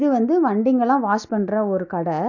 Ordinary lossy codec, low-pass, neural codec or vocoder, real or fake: none; 7.2 kHz; none; real